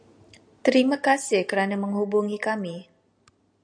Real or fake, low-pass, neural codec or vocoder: real; 9.9 kHz; none